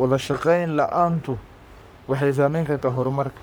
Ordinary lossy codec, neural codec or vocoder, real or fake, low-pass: none; codec, 44.1 kHz, 3.4 kbps, Pupu-Codec; fake; none